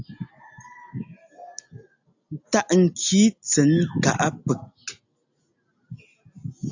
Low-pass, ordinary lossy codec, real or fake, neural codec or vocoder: 7.2 kHz; AAC, 48 kbps; real; none